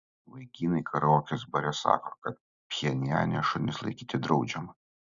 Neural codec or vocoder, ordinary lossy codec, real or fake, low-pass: none; Opus, 64 kbps; real; 7.2 kHz